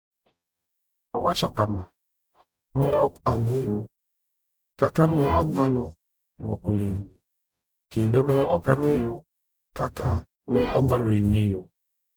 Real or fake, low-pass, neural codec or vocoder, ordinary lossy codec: fake; none; codec, 44.1 kHz, 0.9 kbps, DAC; none